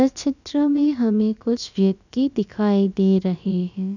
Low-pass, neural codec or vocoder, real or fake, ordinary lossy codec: 7.2 kHz; codec, 16 kHz, about 1 kbps, DyCAST, with the encoder's durations; fake; none